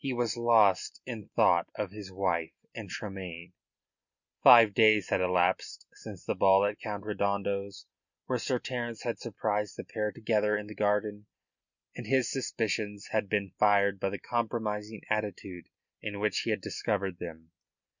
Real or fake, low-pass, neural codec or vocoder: real; 7.2 kHz; none